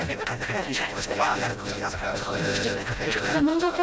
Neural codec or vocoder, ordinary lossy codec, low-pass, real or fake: codec, 16 kHz, 0.5 kbps, FreqCodec, smaller model; none; none; fake